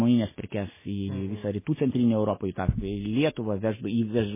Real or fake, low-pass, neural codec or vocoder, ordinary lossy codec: real; 3.6 kHz; none; MP3, 16 kbps